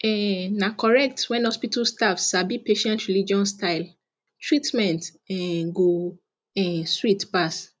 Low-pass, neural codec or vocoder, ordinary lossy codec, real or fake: none; none; none; real